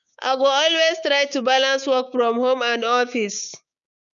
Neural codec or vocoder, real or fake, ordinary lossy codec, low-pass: codec, 16 kHz, 6 kbps, DAC; fake; none; 7.2 kHz